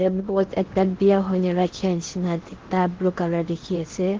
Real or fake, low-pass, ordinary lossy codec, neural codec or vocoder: fake; 7.2 kHz; Opus, 16 kbps; codec, 16 kHz in and 24 kHz out, 0.8 kbps, FocalCodec, streaming, 65536 codes